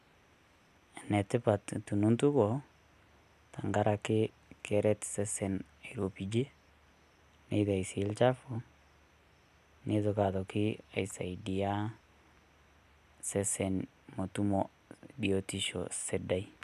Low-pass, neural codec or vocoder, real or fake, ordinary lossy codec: 14.4 kHz; none; real; none